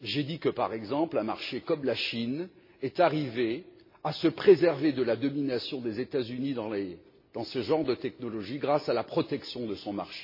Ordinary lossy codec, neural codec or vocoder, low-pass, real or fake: MP3, 24 kbps; none; 5.4 kHz; real